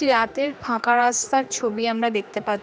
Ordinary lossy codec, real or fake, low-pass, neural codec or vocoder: none; fake; none; codec, 16 kHz, 2 kbps, X-Codec, HuBERT features, trained on general audio